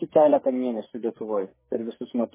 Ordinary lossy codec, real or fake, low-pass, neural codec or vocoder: MP3, 16 kbps; fake; 3.6 kHz; codec, 44.1 kHz, 2.6 kbps, SNAC